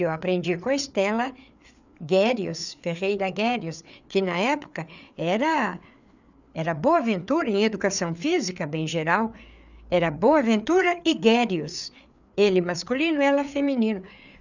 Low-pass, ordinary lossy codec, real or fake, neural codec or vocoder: 7.2 kHz; none; fake; codec, 16 kHz, 4 kbps, FreqCodec, larger model